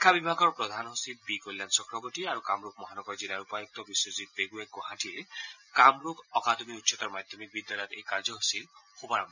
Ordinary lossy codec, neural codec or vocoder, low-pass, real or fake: none; none; 7.2 kHz; real